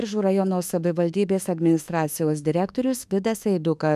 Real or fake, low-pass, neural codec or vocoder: fake; 14.4 kHz; autoencoder, 48 kHz, 32 numbers a frame, DAC-VAE, trained on Japanese speech